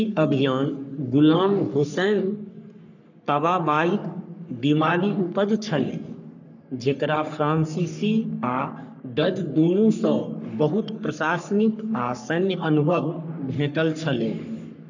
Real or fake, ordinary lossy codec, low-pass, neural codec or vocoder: fake; none; 7.2 kHz; codec, 44.1 kHz, 3.4 kbps, Pupu-Codec